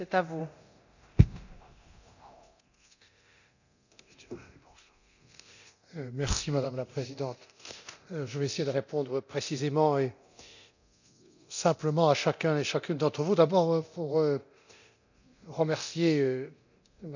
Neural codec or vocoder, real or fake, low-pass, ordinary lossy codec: codec, 24 kHz, 0.9 kbps, DualCodec; fake; 7.2 kHz; none